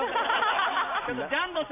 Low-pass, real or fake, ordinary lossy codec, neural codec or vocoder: 3.6 kHz; real; none; none